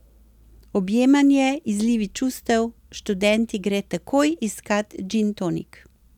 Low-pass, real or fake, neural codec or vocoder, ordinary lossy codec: 19.8 kHz; real; none; none